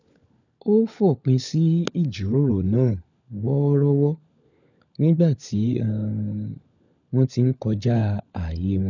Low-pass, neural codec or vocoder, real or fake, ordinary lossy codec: 7.2 kHz; codec, 16 kHz, 16 kbps, FunCodec, trained on LibriTTS, 50 frames a second; fake; none